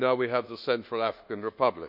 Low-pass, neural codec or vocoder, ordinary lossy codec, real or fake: 5.4 kHz; codec, 24 kHz, 1.2 kbps, DualCodec; none; fake